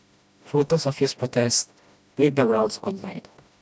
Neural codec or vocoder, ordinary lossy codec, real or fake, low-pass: codec, 16 kHz, 1 kbps, FreqCodec, smaller model; none; fake; none